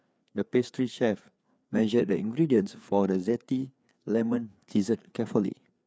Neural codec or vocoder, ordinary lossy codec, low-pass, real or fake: codec, 16 kHz, 4 kbps, FreqCodec, larger model; none; none; fake